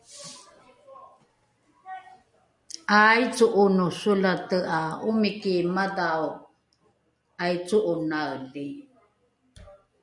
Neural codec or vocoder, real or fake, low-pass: none; real; 10.8 kHz